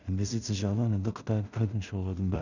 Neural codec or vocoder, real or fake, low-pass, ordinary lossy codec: codec, 16 kHz in and 24 kHz out, 0.4 kbps, LongCat-Audio-Codec, two codebook decoder; fake; 7.2 kHz; none